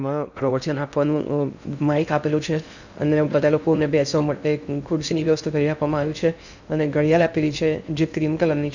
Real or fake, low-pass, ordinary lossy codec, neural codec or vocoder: fake; 7.2 kHz; none; codec, 16 kHz in and 24 kHz out, 0.6 kbps, FocalCodec, streaming, 2048 codes